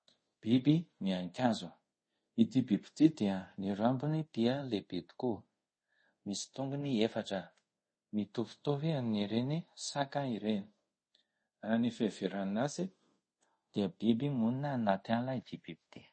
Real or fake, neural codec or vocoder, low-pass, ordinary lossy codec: fake; codec, 24 kHz, 0.5 kbps, DualCodec; 9.9 kHz; MP3, 32 kbps